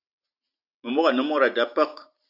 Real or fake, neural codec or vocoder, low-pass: real; none; 5.4 kHz